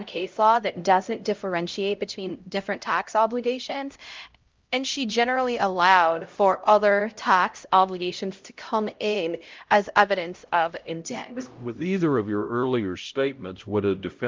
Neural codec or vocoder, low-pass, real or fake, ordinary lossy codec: codec, 16 kHz, 0.5 kbps, X-Codec, HuBERT features, trained on LibriSpeech; 7.2 kHz; fake; Opus, 32 kbps